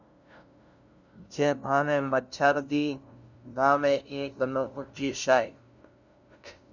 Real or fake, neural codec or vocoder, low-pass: fake; codec, 16 kHz, 0.5 kbps, FunCodec, trained on LibriTTS, 25 frames a second; 7.2 kHz